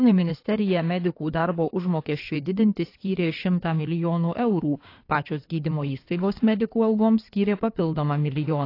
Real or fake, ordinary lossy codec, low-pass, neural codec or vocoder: fake; AAC, 32 kbps; 5.4 kHz; codec, 16 kHz in and 24 kHz out, 2.2 kbps, FireRedTTS-2 codec